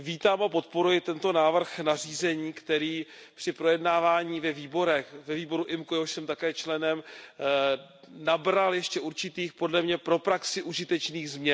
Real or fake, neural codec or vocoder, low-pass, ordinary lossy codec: real; none; none; none